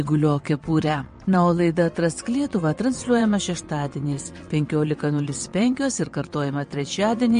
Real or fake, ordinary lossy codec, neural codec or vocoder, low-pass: fake; MP3, 48 kbps; vocoder, 22.05 kHz, 80 mel bands, WaveNeXt; 9.9 kHz